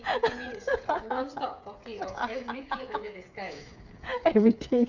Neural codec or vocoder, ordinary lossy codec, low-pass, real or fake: codec, 16 kHz, 8 kbps, FreqCodec, smaller model; none; 7.2 kHz; fake